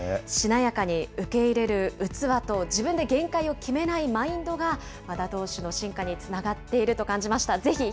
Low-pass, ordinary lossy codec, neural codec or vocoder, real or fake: none; none; none; real